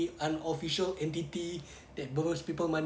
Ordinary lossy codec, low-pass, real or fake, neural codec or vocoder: none; none; real; none